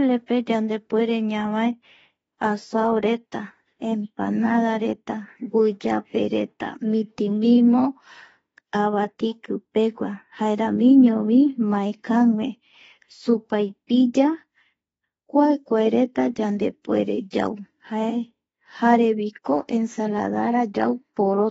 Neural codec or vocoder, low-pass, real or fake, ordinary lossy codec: autoencoder, 48 kHz, 32 numbers a frame, DAC-VAE, trained on Japanese speech; 19.8 kHz; fake; AAC, 24 kbps